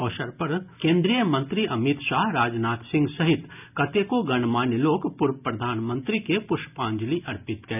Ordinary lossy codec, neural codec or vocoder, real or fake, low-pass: none; none; real; 3.6 kHz